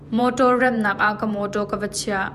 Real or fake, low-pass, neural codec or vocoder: fake; 14.4 kHz; vocoder, 48 kHz, 128 mel bands, Vocos